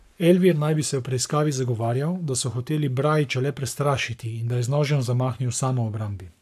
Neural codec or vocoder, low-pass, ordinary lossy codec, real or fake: codec, 44.1 kHz, 7.8 kbps, Pupu-Codec; 14.4 kHz; AAC, 96 kbps; fake